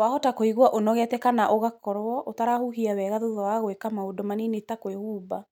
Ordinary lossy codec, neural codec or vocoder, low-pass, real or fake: none; none; 19.8 kHz; real